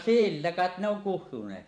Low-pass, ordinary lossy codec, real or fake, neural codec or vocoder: 9.9 kHz; none; fake; vocoder, 44.1 kHz, 128 mel bands every 512 samples, BigVGAN v2